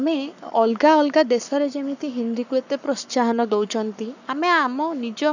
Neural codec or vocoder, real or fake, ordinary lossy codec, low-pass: codec, 44.1 kHz, 7.8 kbps, Pupu-Codec; fake; none; 7.2 kHz